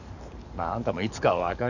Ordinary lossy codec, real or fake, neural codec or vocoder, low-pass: none; fake; codec, 44.1 kHz, 7.8 kbps, DAC; 7.2 kHz